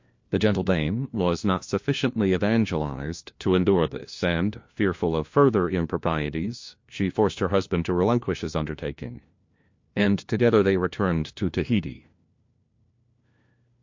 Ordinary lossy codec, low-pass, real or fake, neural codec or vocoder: MP3, 48 kbps; 7.2 kHz; fake; codec, 16 kHz, 1 kbps, FunCodec, trained on LibriTTS, 50 frames a second